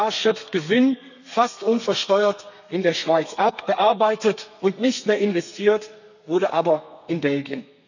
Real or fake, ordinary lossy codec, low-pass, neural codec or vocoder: fake; none; 7.2 kHz; codec, 32 kHz, 1.9 kbps, SNAC